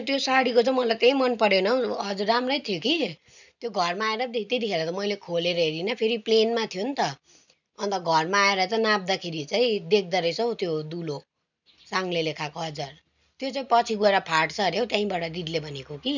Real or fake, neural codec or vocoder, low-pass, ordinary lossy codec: real; none; 7.2 kHz; none